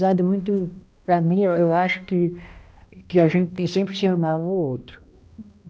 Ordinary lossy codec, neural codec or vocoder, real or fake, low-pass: none; codec, 16 kHz, 1 kbps, X-Codec, HuBERT features, trained on balanced general audio; fake; none